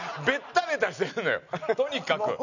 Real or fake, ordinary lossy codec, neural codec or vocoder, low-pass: real; none; none; 7.2 kHz